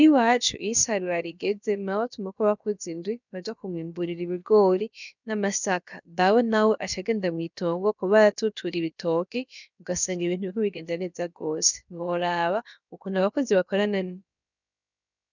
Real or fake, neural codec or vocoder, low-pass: fake; codec, 16 kHz, about 1 kbps, DyCAST, with the encoder's durations; 7.2 kHz